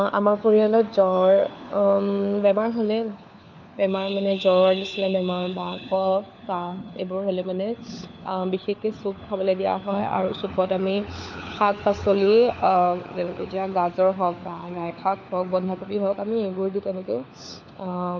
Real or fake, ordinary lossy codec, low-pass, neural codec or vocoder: fake; none; 7.2 kHz; codec, 16 kHz, 4 kbps, FunCodec, trained on LibriTTS, 50 frames a second